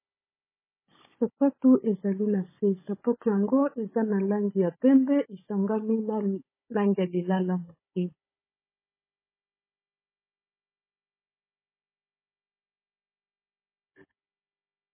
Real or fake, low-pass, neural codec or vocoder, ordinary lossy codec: fake; 3.6 kHz; codec, 16 kHz, 16 kbps, FunCodec, trained on Chinese and English, 50 frames a second; MP3, 16 kbps